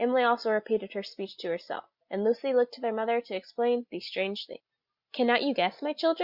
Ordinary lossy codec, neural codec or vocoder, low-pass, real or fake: Opus, 64 kbps; none; 5.4 kHz; real